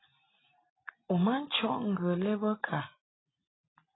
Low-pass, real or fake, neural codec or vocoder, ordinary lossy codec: 7.2 kHz; real; none; AAC, 16 kbps